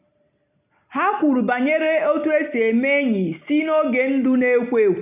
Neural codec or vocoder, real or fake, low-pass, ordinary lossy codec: none; real; 3.6 kHz; none